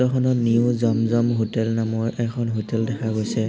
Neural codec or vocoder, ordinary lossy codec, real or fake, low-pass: none; none; real; none